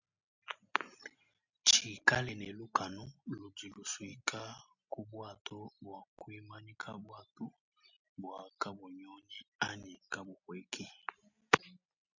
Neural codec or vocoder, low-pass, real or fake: none; 7.2 kHz; real